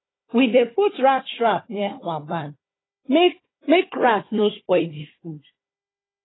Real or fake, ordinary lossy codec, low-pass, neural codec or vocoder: fake; AAC, 16 kbps; 7.2 kHz; codec, 16 kHz, 4 kbps, FunCodec, trained on Chinese and English, 50 frames a second